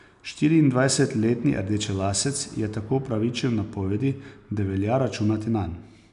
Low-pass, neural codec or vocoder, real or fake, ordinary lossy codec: 10.8 kHz; none; real; none